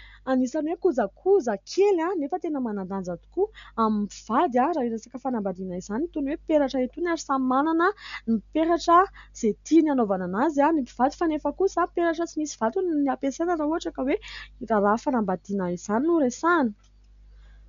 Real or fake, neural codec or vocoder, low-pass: real; none; 7.2 kHz